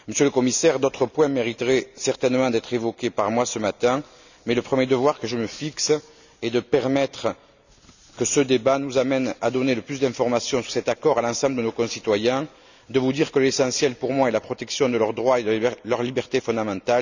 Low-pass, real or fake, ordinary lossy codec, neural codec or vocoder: 7.2 kHz; real; none; none